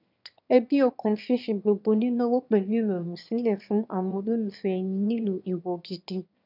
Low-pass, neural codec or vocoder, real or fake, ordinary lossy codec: 5.4 kHz; autoencoder, 22.05 kHz, a latent of 192 numbers a frame, VITS, trained on one speaker; fake; none